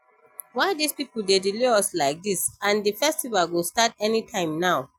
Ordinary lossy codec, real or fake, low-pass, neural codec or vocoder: none; real; 19.8 kHz; none